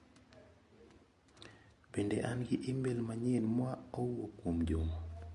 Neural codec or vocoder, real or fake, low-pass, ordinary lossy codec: none; real; 14.4 kHz; MP3, 48 kbps